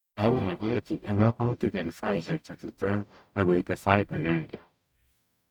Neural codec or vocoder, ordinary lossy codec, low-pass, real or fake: codec, 44.1 kHz, 0.9 kbps, DAC; none; 19.8 kHz; fake